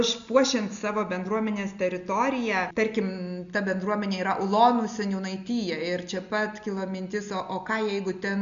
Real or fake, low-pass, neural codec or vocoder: real; 7.2 kHz; none